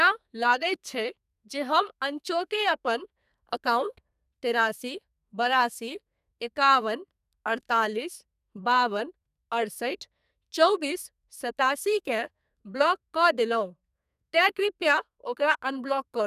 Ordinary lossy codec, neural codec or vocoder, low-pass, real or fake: none; codec, 32 kHz, 1.9 kbps, SNAC; 14.4 kHz; fake